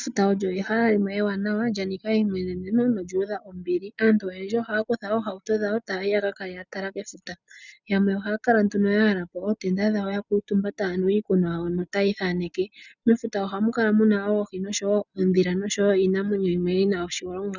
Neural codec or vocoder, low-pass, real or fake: none; 7.2 kHz; real